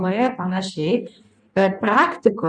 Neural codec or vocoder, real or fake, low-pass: codec, 16 kHz in and 24 kHz out, 1.1 kbps, FireRedTTS-2 codec; fake; 9.9 kHz